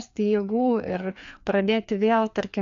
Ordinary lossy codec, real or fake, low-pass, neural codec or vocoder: AAC, 64 kbps; fake; 7.2 kHz; codec, 16 kHz, 2 kbps, FreqCodec, larger model